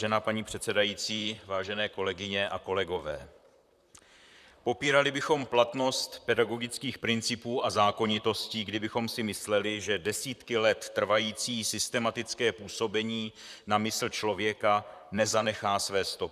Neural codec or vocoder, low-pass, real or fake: vocoder, 44.1 kHz, 128 mel bands, Pupu-Vocoder; 14.4 kHz; fake